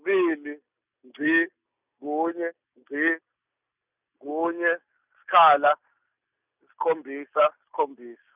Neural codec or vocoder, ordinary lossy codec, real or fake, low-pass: none; none; real; 3.6 kHz